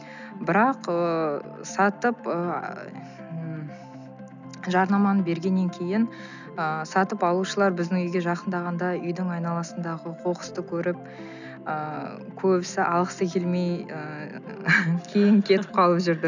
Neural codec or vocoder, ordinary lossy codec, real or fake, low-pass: none; none; real; 7.2 kHz